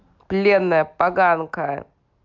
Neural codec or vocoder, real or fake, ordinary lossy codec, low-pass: none; real; MP3, 64 kbps; 7.2 kHz